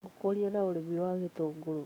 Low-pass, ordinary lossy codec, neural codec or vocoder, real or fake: 19.8 kHz; none; codec, 44.1 kHz, 7.8 kbps, DAC; fake